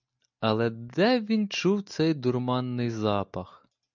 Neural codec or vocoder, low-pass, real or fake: none; 7.2 kHz; real